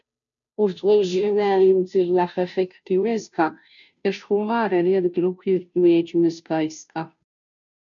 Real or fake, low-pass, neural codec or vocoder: fake; 7.2 kHz; codec, 16 kHz, 0.5 kbps, FunCodec, trained on Chinese and English, 25 frames a second